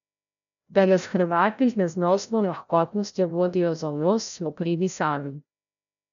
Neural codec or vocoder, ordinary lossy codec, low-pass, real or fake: codec, 16 kHz, 0.5 kbps, FreqCodec, larger model; none; 7.2 kHz; fake